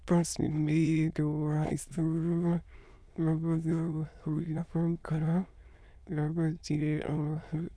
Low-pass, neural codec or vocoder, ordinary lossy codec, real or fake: none; autoencoder, 22.05 kHz, a latent of 192 numbers a frame, VITS, trained on many speakers; none; fake